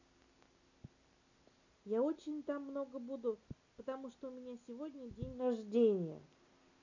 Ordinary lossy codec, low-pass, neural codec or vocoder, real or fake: none; 7.2 kHz; none; real